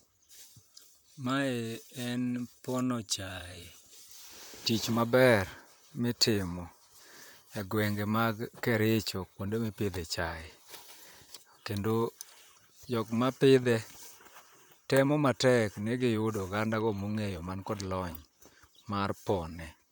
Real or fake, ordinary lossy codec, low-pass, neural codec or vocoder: fake; none; none; vocoder, 44.1 kHz, 128 mel bands, Pupu-Vocoder